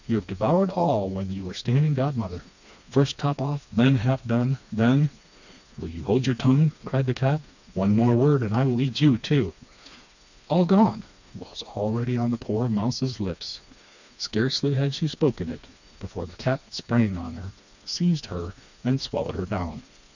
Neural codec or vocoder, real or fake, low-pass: codec, 16 kHz, 2 kbps, FreqCodec, smaller model; fake; 7.2 kHz